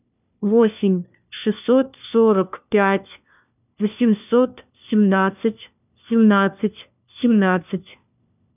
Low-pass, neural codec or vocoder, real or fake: 3.6 kHz; codec, 16 kHz, 1 kbps, FunCodec, trained on LibriTTS, 50 frames a second; fake